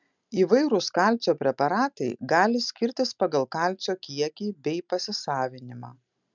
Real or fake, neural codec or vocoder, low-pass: real; none; 7.2 kHz